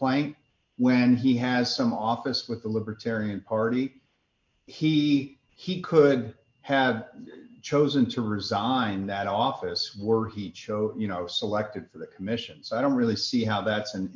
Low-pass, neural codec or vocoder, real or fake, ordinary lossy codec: 7.2 kHz; none; real; MP3, 48 kbps